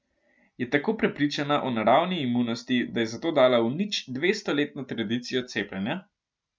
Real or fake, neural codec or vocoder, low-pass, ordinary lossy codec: real; none; none; none